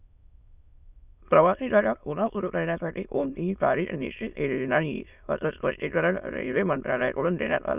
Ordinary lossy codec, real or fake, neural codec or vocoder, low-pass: none; fake; autoencoder, 22.05 kHz, a latent of 192 numbers a frame, VITS, trained on many speakers; 3.6 kHz